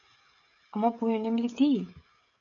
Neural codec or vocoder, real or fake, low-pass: codec, 16 kHz, 16 kbps, FreqCodec, smaller model; fake; 7.2 kHz